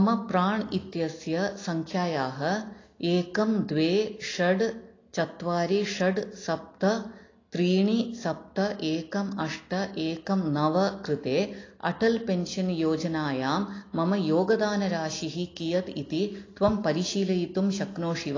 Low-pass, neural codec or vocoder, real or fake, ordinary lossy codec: 7.2 kHz; none; real; AAC, 32 kbps